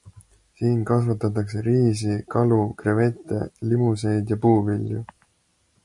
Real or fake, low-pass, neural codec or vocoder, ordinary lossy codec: real; 10.8 kHz; none; MP3, 48 kbps